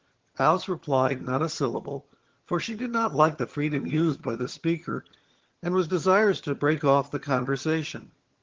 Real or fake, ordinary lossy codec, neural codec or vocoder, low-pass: fake; Opus, 16 kbps; vocoder, 22.05 kHz, 80 mel bands, HiFi-GAN; 7.2 kHz